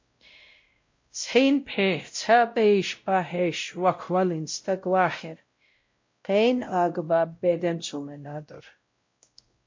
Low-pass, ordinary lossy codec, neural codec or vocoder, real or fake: 7.2 kHz; MP3, 48 kbps; codec, 16 kHz, 0.5 kbps, X-Codec, WavLM features, trained on Multilingual LibriSpeech; fake